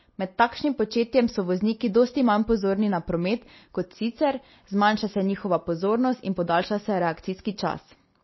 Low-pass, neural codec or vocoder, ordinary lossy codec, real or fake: 7.2 kHz; none; MP3, 24 kbps; real